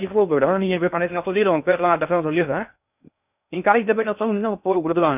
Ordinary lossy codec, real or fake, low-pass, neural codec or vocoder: none; fake; 3.6 kHz; codec, 16 kHz in and 24 kHz out, 0.6 kbps, FocalCodec, streaming, 2048 codes